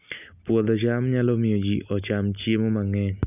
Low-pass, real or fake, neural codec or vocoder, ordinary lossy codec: 3.6 kHz; real; none; none